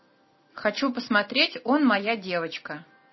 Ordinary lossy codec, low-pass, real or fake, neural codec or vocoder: MP3, 24 kbps; 7.2 kHz; real; none